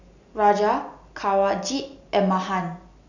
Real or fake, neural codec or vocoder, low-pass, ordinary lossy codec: real; none; 7.2 kHz; none